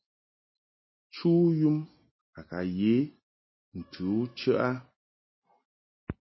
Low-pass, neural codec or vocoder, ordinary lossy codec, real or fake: 7.2 kHz; none; MP3, 24 kbps; real